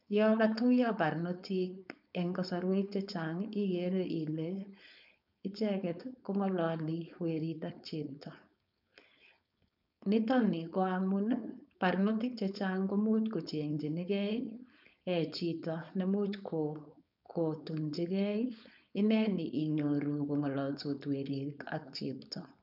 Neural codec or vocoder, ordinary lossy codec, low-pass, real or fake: codec, 16 kHz, 4.8 kbps, FACodec; none; 5.4 kHz; fake